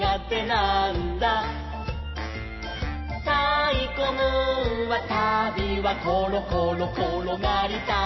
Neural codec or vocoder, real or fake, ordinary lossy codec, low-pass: none; real; MP3, 24 kbps; 7.2 kHz